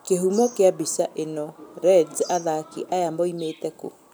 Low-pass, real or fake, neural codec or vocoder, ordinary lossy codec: none; real; none; none